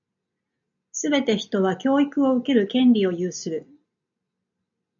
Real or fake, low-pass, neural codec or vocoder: real; 7.2 kHz; none